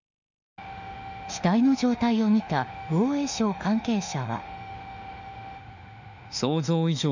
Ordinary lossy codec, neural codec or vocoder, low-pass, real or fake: none; autoencoder, 48 kHz, 32 numbers a frame, DAC-VAE, trained on Japanese speech; 7.2 kHz; fake